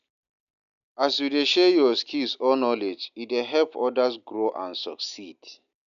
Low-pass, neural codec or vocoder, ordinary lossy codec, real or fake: 7.2 kHz; none; none; real